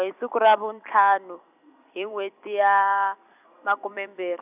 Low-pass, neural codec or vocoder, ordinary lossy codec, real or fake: 3.6 kHz; vocoder, 44.1 kHz, 128 mel bands every 256 samples, BigVGAN v2; none; fake